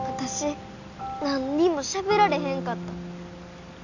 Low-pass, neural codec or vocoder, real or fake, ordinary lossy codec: 7.2 kHz; none; real; none